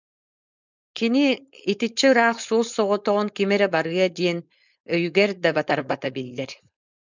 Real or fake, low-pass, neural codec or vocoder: fake; 7.2 kHz; codec, 16 kHz, 4.8 kbps, FACodec